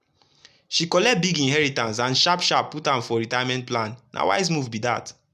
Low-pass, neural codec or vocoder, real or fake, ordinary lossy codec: 14.4 kHz; none; real; none